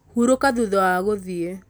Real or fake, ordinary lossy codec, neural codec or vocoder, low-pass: real; none; none; none